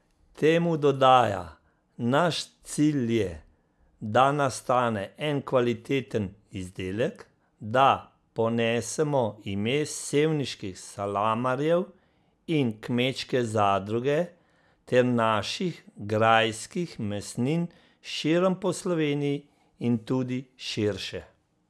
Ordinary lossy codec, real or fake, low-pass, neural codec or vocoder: none; real; none; none